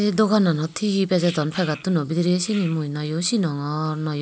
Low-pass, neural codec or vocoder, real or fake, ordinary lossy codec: none; none; real; none